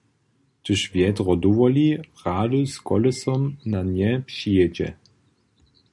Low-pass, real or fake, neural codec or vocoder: 10.8 kHz; real; none